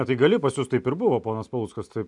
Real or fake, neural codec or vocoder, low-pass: real; none; 10.8 kHz